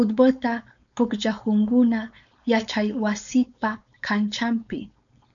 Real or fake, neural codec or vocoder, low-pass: fake; codec, 16 kHz, 4.8 kbps, FACodec; 7.2 kHz